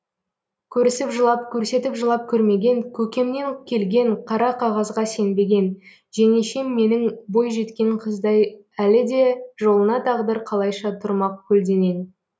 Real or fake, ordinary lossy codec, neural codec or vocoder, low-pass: real; none; none; none